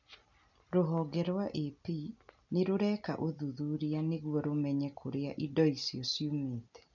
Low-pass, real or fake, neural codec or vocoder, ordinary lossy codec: 7.2 kHz; real; none; none